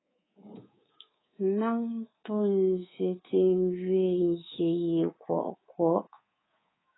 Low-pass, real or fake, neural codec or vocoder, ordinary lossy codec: 7.2 kHz; fake; codec, 24 kHz, 3.1 kbps, DualCodec; AAC, 16 kbps